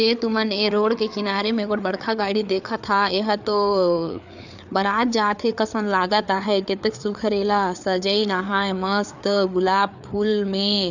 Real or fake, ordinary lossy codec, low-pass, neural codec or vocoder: fake; none; 7.2 kHz; codec, 16 kHz, 4 kbps, FreqCodec, larger model